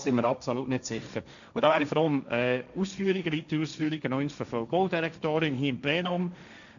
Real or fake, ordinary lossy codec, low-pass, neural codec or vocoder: fake; none; 7.2 kHz; codec, 16 kHz, 1.1 kbps, Voila-Tokenizer